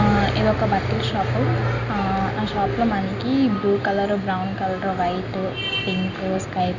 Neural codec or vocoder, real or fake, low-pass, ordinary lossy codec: none; real; 7.2 kHz; Opus, 64 kbps